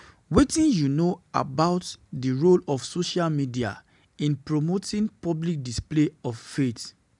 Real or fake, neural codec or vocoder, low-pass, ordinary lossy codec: real; none; 10.8 kHz; none